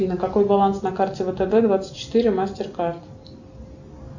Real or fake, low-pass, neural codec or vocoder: real; 7.2 kHz; none